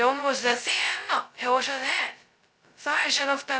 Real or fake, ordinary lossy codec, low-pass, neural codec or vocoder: fake; none; none; codec, 16 kHz, 0.2 kbps, FocalCodec